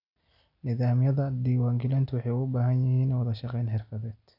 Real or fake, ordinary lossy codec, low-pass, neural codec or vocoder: real; none; 5.4 kHz; none